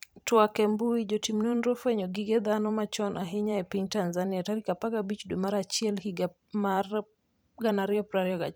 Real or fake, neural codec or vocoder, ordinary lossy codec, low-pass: fake; vocoder, 44.1 kHz, 128 mel bands every 512 samples, BigVGAN v2; none; none